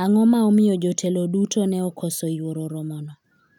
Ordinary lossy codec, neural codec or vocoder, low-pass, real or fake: none; none; 19.8 kHz; real